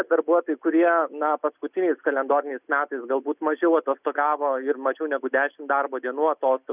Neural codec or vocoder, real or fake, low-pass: none; real; 3.6 kHz